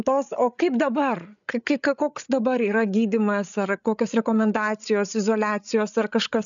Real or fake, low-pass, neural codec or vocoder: fake; 7.2 kHz; codec, 16 kHz, 8 kbps, FreqCodec, larger model